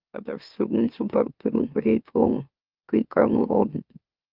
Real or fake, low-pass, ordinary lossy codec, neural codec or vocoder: fake; 5.4 kHz; Opus, 32 kbps; autoencoder, 44.1 kHz, a latent of 192 numbers a frame, MeloTTS